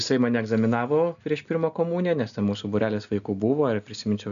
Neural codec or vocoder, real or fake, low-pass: none; real; 7.2 kHz